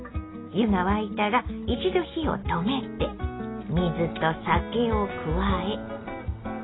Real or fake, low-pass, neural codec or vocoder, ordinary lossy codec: real; 7.2 kHz; none; AAC, 16 kbps